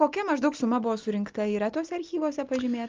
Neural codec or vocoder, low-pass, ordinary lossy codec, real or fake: none; 7.2 kHz; Opus, 24 kbps; real